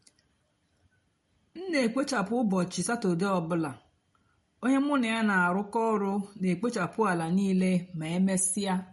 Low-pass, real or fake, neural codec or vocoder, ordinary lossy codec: 19.8 kHz; real; none; MP3, 48 kbps